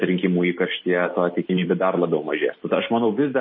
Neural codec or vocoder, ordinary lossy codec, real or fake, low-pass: none; MP3, 24 kbps; real; 7.2 kHz